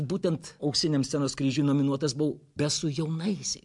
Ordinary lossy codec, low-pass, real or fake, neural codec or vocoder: MP3, 64 kbps; 10.8 kHz; real; none